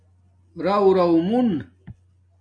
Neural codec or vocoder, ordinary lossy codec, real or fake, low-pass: none; MP3, 96 kbps; real; 9.9 kHz